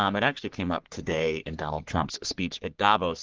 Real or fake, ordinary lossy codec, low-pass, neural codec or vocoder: fake; Opus, 16 kbps; 7.2 kHz; codec, 44.1 kHz, 3.4 kbps, Pupu-Codec